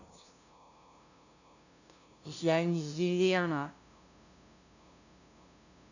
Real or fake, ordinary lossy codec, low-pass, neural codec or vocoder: fake; none; 7.2 kHz; codec, 16 kHz, 0.5 kbps, FunCodec, trained on LibriTTS, 25 frames a second